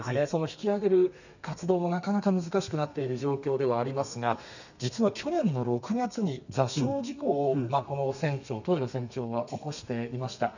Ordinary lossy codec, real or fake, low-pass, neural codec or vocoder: none; fake; 7.2 kHz; codec, 32 kHz, 1.9 kbps, SNAC